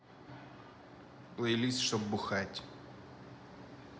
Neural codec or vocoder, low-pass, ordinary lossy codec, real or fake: none; none; none; real